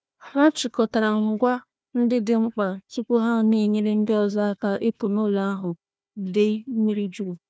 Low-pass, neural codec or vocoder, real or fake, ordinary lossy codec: none; codec, 16 kHz, 1 kbps, FunCodec, trained on Chinese and English, 50 frames a second; fake; none